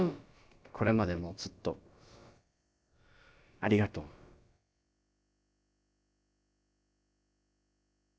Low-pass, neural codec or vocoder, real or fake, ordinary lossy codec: none; codec, 16 kHz, about 1 kbps, DyCAST, with the encoder's durations; fake; none